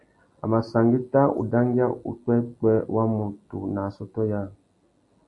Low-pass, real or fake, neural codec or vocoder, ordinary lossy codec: 10.8 kHz; real; none; AAC, 48 kbps